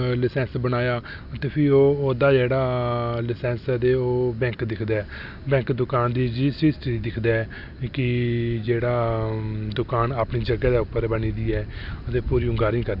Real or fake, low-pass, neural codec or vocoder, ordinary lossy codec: real; 5.4 kHz; none; none